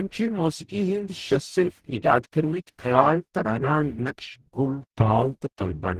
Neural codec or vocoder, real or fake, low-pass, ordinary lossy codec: codec, 44.1 kHz, 0.9 kbps, DAC; fake; 14.4 kHz; Opus, 16 kbps